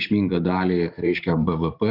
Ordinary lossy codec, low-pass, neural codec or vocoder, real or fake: Opus, 64 kbps; 5.4 kHz; vocoder, 44.1 kHz, 128 mel bands every 512 samples, BigVGAN v2; fake